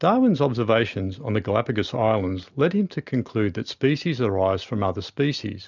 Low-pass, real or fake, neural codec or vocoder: 7.2 kHz; real; none